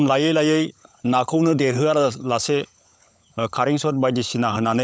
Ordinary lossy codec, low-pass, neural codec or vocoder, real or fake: none; none; codec, 16 kHz, 16 kbps, FunCodec, trained on LibriTTS, 50 frames a second; fake